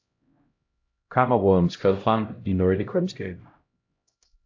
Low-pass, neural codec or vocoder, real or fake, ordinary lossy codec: 7.2 kHz; codec, 16 kHz, 0.5 kbps, X-Codec, HuBERT features, trained on LibriSpeech; fake; AAC, 48 kbps